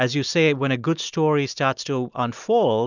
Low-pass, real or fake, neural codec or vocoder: 7.2 kHz; real; none